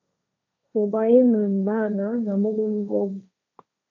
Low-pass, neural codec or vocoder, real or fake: 7.2 kHz; codec, 16 kHz, 1.1 kbps, Voila-Tokenizer; fake